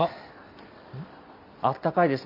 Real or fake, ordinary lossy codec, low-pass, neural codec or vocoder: real; none; 5.4 kHz; none